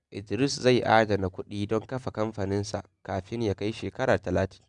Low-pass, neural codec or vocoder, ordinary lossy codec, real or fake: 10.8 kHz; none; none; real